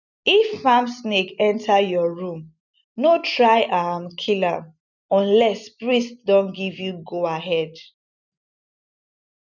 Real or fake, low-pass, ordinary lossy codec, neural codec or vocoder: real; 7.2 kHz; none; none